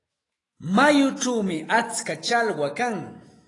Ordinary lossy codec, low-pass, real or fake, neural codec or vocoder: AAC, 32 kbps; 10.8 kHz; fake; autoencoder, 48 kHz, 128 numbers a frame, DAC-VAE, trained on Japanese speech